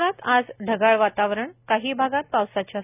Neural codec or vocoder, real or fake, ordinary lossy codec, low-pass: none; real; none; 3.6 kHz